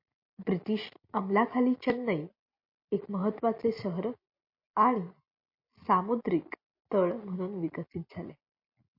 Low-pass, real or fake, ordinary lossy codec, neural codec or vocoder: 5.4 kHz; real; MP3, 32 kbps; none